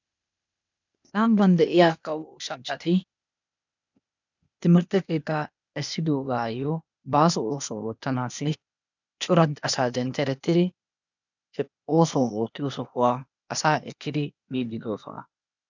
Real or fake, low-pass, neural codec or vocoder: fake; 7.2 kHz; codec, 16 kHz, 0.8 kbps, ZipCodec